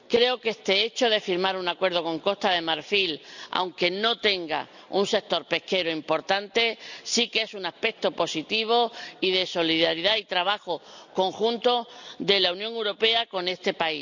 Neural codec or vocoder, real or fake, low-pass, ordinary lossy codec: none; real; 7.2 kHz; none